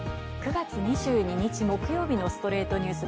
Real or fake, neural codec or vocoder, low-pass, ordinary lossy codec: real; none; none; none